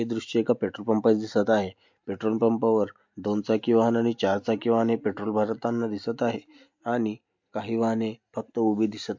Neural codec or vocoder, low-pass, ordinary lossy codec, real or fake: none; 7.2 kHz; MP3, 48 kbps; real